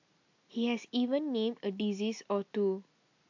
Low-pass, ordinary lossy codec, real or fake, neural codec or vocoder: 7.2 kHz; AAC, 48 kbps; real; none